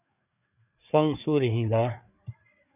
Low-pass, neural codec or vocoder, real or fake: 3.6 kHz; codec, 16 kHz, 4 kbps, FreqCodec, larger model; fake